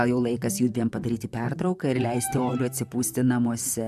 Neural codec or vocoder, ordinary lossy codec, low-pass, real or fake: vocoder, 44.1 kHz, 128 mel bands, Pupu-Vocoder; MP3, 96 kbps; 14.4 kHz; fake